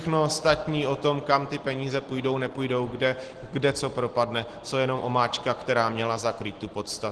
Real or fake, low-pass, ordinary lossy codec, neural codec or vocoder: real; 10.8 kHz; Opus, 16 kbps; none